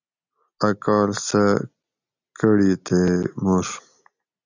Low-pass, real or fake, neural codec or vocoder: 7.2 kHz; real; none